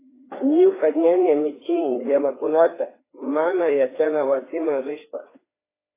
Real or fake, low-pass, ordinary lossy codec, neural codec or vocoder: fake; 3.6 kHz; AAC, 16 kbps; codec, 16 kHz, 2 kbps, FreqCodec, larger model